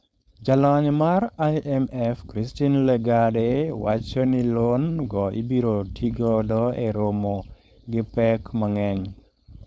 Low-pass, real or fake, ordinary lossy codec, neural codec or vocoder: none; fake; none; codec, 16 kHz, 4.8 kbps, FACodec